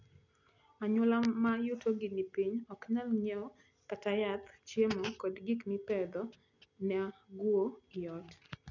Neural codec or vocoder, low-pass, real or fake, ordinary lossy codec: none; 7.2 kHz; real; none